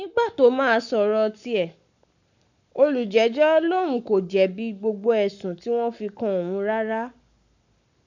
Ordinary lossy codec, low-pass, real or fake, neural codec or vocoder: none; 7.2 kHz; fake; codec, 44.1 kHz, 7.8 kbps, Pupu-Codec